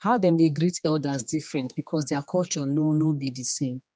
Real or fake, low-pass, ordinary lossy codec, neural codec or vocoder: fake; none; none; codec, 16 kHz, 2 kbps, X-Codec, HuBERT features, trained on general audio